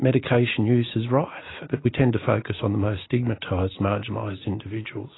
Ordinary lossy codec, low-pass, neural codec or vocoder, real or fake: AAC, 16 kbps; 7.2 kHz; none; real